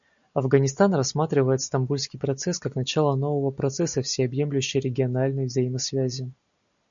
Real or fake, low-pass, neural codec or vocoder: real; 7.2 kHz; none